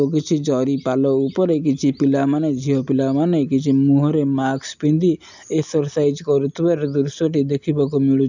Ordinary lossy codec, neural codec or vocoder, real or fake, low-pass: none; none; real; 7.2 kHz